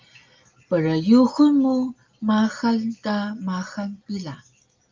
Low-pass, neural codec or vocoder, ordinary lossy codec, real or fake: 7.2 kHz; none; Opus, 24 kbps; real